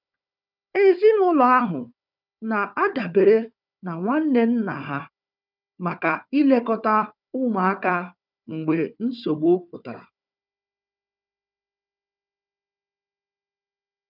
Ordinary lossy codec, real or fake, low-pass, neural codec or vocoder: none; fake; 5.4 kHz; codec, 16 kHz, 4 kbps, FunCodec, trained on Chinese and English, 50 frames a second